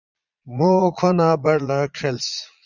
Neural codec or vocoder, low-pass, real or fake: vocoder, 22.05 kHz, 80 mel bands, Vocos; 7.2 kHz; fake